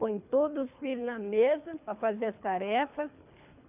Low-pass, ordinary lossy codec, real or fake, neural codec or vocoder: 3.6 kHz; none; fake; codec, 24 kHz, 3 kbps, HILCodec